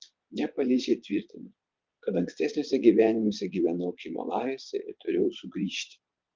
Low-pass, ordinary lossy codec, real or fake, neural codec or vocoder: 7.2 kHz; Opus, 32 kbps; fake; vocoder, 22.05 kHz, 80 mel bands, Vocos